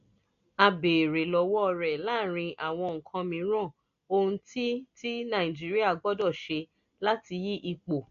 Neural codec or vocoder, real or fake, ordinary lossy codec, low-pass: none; real; AAC, 64 kbps; 7.2 kHz